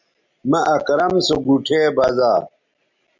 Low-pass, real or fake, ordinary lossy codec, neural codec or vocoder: 7.2 kHz; real; MP3, 64 kbps; none